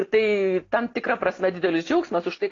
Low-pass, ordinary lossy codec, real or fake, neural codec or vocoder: 7.2 kHz; AAC, 32 kbps; real; none